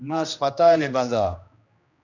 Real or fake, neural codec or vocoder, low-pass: fake; codec, 16 kHz, 1 kbps, X-Codec, HuBERT features, trained on general audio; 7.2 kHz